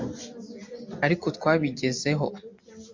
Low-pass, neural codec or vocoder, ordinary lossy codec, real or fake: 7.2 kHz; none; MP3, 48 kbps; real